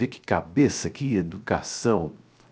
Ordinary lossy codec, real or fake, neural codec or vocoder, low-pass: none; fake; codec, 16 kHz, 0.7 kbps, FocalCodec; none